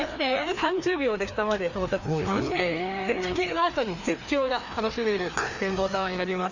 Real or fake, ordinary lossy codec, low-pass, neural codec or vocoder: fake; none; 7.2 kHz; codec, 16 kHz, 2 kbps, FreqCodec, larger model